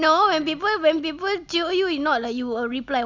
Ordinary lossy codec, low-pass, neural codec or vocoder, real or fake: Opus, 64 kbps; 7.2 kHz; none; real